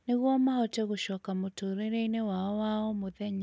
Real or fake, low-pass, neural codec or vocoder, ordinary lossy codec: real; none; none; none